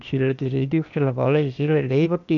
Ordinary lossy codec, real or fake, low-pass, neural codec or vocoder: none; fake; 7.2 kHz; codec, 16 kHz, 0.8 kbps, ZipCodec